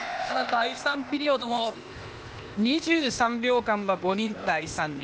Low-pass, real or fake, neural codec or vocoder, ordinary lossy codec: none; fake; codec, 16 kHz, 0.8 kbps, ZipCodec; none